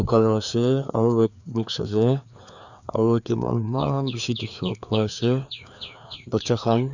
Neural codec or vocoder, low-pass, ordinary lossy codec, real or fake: codec, 16 kHz, 2 kbps, FreqCodec, larger model; 7.2 kHz; none; fake